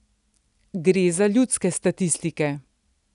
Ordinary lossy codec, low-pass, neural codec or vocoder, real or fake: none; 10.8 kHz; none; real